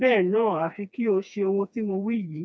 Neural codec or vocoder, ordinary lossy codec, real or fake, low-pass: codec, 16 kHz, 2 kbps, FreqCodec, smaller model; none; fake; none